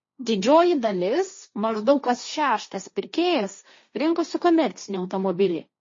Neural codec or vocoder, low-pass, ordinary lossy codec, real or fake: codec, 16 kHz, 1.1 kbps, Voila-Tokenizer; 7.2 kHz; MP3, 32 kbps; fake